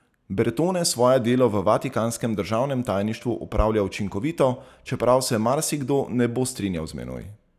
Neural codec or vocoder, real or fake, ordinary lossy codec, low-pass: none; real; none; 14.4 kHz